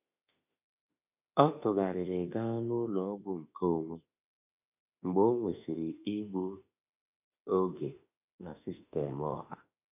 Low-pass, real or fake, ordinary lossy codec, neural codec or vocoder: 3.6 kHz; fake; none; autoencoder, 48 kHz, 32 numbers a frame, DAC-VAE, trained on Japanese speech